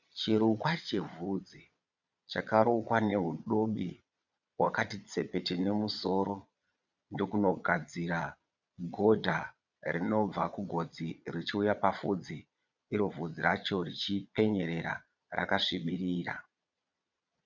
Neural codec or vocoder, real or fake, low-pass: vocoder, 22.05 kHz, 80 mel bands, Vocos; fake; 7.2 kHz